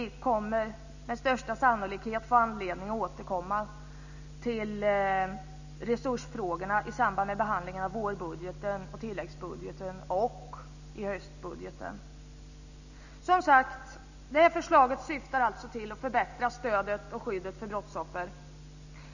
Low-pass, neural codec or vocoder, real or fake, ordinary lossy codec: 7.2 kHz; none; real; none